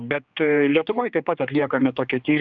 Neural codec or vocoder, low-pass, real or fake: codec, 16 kHz, 2 kbps, X-Codec, HuBERT features, trained on general audio; 7.2 kHz; fake